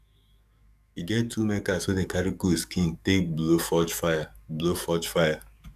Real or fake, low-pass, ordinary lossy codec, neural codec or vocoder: fake; 14.4 kHz; none; codec, 44.1 kHz, 7.8 kbps, DAC